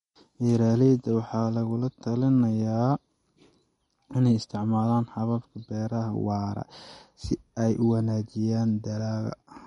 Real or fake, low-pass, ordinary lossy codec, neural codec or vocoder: real; 19.8 kHz; MP3, 48 kbps; none